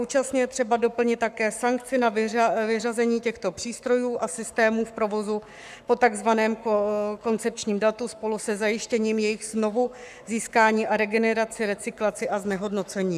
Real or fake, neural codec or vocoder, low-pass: fake; codec, 44.1 kHz, 7.8 kbps, Pupu-Codec; 14.4 kHz